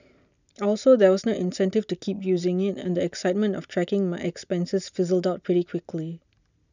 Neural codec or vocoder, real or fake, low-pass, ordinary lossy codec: none; real; 7.2 kHz; none